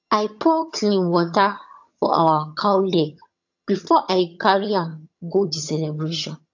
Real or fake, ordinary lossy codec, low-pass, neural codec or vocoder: fake; AAC, 48 kbps; 7.2 kHz; vocoder, 22.05 kHz, 80 mel bands, HiFi-GAN